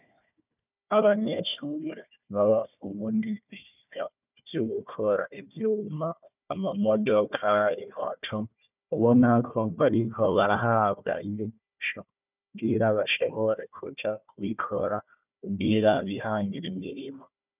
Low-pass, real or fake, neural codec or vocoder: 3.6 kHz; fake; codec, 16 kHz, 1 kbps, FunCodec, trained on Chinese and English, 50 frames a second